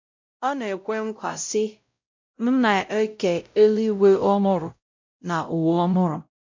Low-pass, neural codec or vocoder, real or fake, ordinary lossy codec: 7.2 kHz; codec, 16 kHz, 0.5 kbps, X-Codec, WavLM features, trained on Multilingual LibriSpeech; fake; MP3, 48 kbps